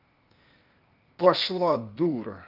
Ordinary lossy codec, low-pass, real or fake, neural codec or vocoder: Opus, 32 kbps; 5.4 kHz; fake; codec, 16 kHz, 0.8 kbps, ZipCodec